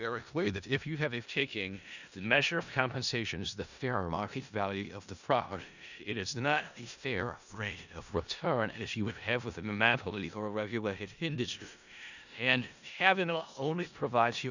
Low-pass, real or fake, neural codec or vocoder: 7.2 kHz; fake; codec, 16 kHz in and 24 kHz out, 0.4 kbps, LongCat-Audio-Codec, four codebook decoder